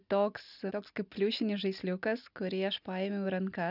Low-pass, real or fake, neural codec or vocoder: 5.4 kHz; real; none